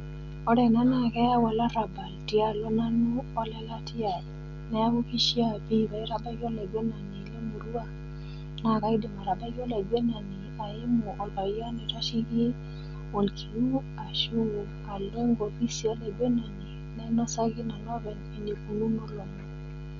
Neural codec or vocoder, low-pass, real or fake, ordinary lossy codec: none; 7.2 kHz; real; none